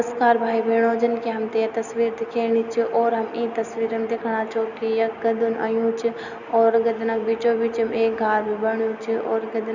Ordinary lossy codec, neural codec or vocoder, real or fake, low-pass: none; none; real; 7.2 kHz